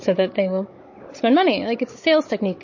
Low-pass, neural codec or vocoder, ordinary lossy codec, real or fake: 7.2 kHz; codec, 16 kHz, 16 kbps, FunCodec, trained on Chinese and English, 50 frames a second; MP3, 32 kbps; fake